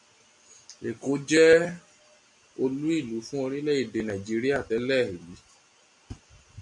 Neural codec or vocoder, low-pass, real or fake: none; 10.8 kHz; real